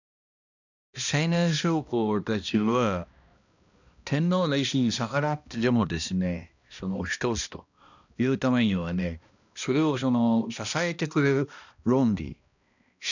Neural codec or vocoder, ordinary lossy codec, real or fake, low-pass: codec, 16 kHz, 1 kbps, X-Codec, HuBERT features, trained on balanced general audio; none; fake; 7.2 kHz